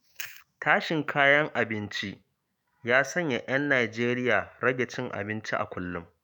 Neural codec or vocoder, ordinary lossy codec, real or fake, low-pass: autoencoder, 48 kHz, 128 numbers a frame, DAC-VAE, trained on Japanese speech; none; fake; none